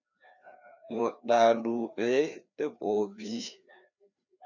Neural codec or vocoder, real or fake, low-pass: codec, 16 kHz, 2 kbps, FreqCodec, larger model; fake; 7.2 kHz